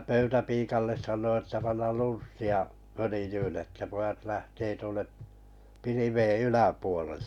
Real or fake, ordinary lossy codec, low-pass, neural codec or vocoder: real; none; 19.8 kHz; none